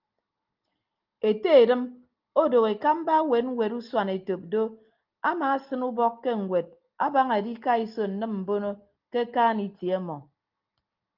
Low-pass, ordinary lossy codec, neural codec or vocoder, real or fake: 5.4 kHz; Opus, 32 kbps; none; real